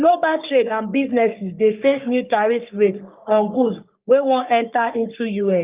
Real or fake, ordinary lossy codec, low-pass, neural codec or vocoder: fake; Opus, 24 kbps; 3.6 kHz; codec, 44.1 kHz, 3.4 kbps, Pupu-Codec